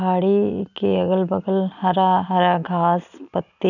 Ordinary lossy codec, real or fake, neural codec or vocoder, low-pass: none; real; none; 7.2 kHz